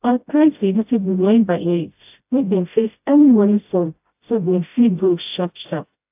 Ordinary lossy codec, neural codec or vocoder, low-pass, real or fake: AAC, 32 kbps; codec, 16 kHz, 0.5 kbps, FreqCodec, smaller model; 3.6 kHz; fake